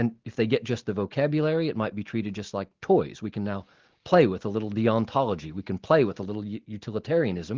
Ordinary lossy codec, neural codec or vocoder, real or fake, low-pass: Opus, 16 kbps; none; real; 7.2 kHz